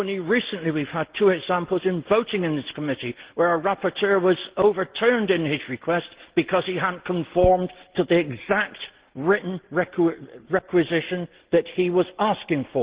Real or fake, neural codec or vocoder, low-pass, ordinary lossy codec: real; none; 3.6 kHz; Opus, 16 kbps